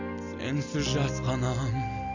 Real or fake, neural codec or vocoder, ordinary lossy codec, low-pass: real; none; none; 7.2 kHz